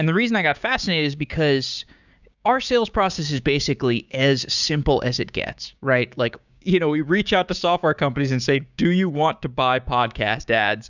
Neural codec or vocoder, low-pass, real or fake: codec, 16 kHz, 6 kbps, DAC; 7.2 kHz; fake